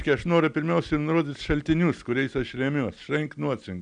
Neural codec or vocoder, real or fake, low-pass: none; real; 9.9 kHz